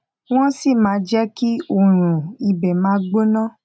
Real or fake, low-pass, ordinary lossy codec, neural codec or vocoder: real; none; none; none